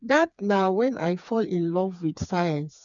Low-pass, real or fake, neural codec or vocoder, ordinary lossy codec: 7.2 kHz; fake; codec, 16 kHz, 4 kbps, FreqCodec, smaller model; none